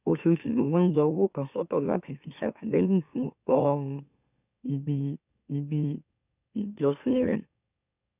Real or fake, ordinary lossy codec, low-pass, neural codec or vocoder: fake; none; 3.6 kHz; autoencoder, 44.1 kHz, a latent of 192 numbers a frame, MeloTTS